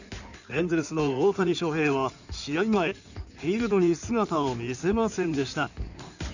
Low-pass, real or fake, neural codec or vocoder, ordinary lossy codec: 7.2 kHz; fake; codec, 16 kHz in and 24 kHz out, 2.2 kbps, FireRedTTS-2 codec; none